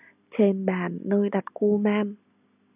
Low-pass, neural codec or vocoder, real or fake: 3.6 kHz; none; real